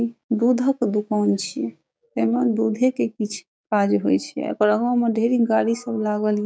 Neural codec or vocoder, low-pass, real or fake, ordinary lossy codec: codec, 16 kHz, 6 kbps, DAC; none; fake; none